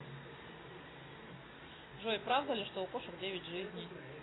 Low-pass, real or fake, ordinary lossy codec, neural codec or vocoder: 7.2 kHz; real; AAC, 16 kbps; none